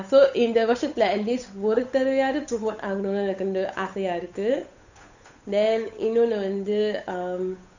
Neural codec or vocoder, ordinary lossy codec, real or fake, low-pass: codec, 16 kHz, 8 kbps, FunCodec, trained on Chinese and English, 25 frames a second; none; fake; 7.2 kHz